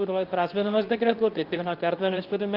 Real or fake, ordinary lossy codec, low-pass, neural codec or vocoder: fake; Opus, 24 kbps; 5.4 kHz; codec, 24 kHz, 0.9 kbps, WavTokenizer, medium speech release version 2